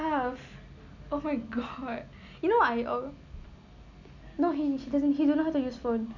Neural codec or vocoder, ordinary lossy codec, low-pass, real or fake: none; none; 7.2 kHz; real